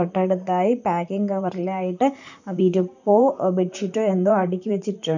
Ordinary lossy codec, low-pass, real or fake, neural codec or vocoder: none; 7.2 kHz; fake; vocoder, 44.1 kHz, 128 mel bands, Pupu-Vocoder